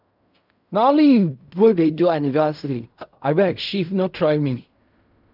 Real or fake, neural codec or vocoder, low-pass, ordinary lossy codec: fake; codec, 16 kHz in and 24 kHz out, 0.4 kbps, LongCat-Audio-Codec, fine tuned four codebook decoder; 5.4 kHz; none